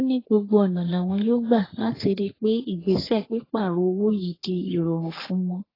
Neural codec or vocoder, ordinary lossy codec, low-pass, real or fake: codec, 44.1 kHz, 3.4 kbps, Pupu-Codec; AAC, 24 kbps; 5.4 kHz; fake